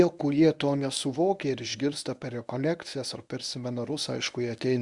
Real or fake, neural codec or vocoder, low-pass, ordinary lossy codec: fake; codec, 24 kHz, 0.9 kbps, WavTokenizer, medium speech release version 1; 10.8 kHz; Opus, 64 kbps